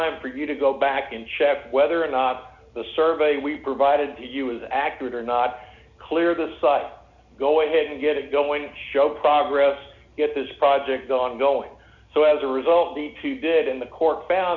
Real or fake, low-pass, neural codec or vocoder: real; 7.2 kHz; none